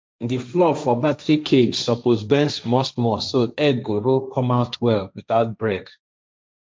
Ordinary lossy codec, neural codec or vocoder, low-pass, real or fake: none; codec, 16 kHz, 1.1 kbps, Voila-Tokenizer; none; fake